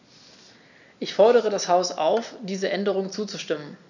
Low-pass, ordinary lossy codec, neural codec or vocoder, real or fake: 7.2 kHz; none; none; real